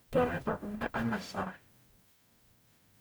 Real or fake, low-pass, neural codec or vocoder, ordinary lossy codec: fake; none; codec, 44.1 kHz, 0.9 kbps, DAC; none